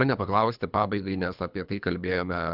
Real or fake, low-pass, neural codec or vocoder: fake; 5.4 kHz; codec, 24 kHz, 3 kbps, HILCodec